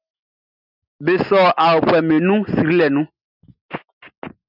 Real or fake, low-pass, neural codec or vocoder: real; 5.4 kHz; none